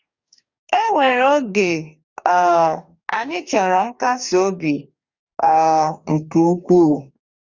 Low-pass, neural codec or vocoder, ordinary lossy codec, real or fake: 7.2 kHz; codec, 44.1 kHz, 2.6 kbps, DAC; Opus, 64 kbps; fake